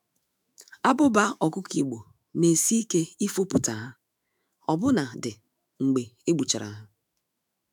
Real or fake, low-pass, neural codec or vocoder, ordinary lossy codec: fake; none; autoencoder, 48 kHz, 128 numbers a frame, DAC-VAE, trained on Japanese speech; none